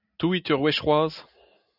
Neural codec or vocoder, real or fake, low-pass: none; real; 5.4 kHz